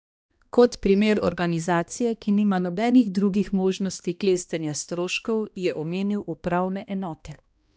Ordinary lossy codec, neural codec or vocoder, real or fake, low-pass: none; codec, 16 kHz, 1 kbps, X-Codec, HuBERT features, trained on balanced general audio; fake; none